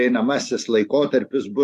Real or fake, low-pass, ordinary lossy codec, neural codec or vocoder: real; 14.4 kHz; AAC, 64 kbps; none